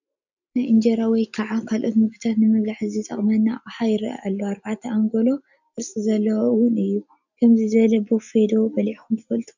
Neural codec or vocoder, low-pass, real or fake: none; 7.2 kHz; real